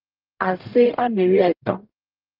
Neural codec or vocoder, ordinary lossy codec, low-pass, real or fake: codec, 44.1 kHz, 0.9 kbps, DAC; Opus, 24 kbps; 5.4 kHz; fake